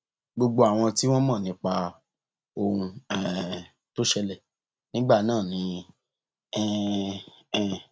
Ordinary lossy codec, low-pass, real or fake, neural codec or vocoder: none; none; real; none